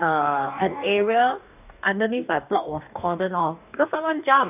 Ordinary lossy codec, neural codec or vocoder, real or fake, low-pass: none; codec, 44.1 kHz, 2.6 kbps, DAC; fake; 3.6 kHz